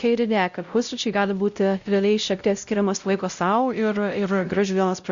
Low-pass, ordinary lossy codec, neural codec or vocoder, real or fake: 7.2 kHz; Opus, 64 kbps; codec, 16 kHz, 0.5 kbps, X-Codec, WavLM features, trained on Multilingual LibriSpeech; fake